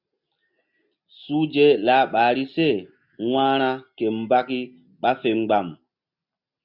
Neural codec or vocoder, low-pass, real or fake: none; 5.4 kHz; real